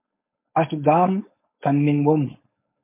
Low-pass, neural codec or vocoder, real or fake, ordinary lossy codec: 3.6 kHz; codec, 16 kHz, 4.8 kbps, FACodec; fake; MP3, 24 kbps